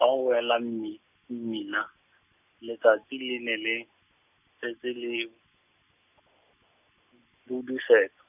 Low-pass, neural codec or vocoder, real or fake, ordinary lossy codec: 3.6 kHz; none; real; none